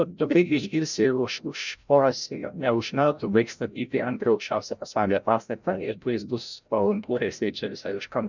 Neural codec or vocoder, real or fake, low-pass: codec, 16 kHz, 0.5 kbps, FreqCodec, larger model; fake; 7.2 kHz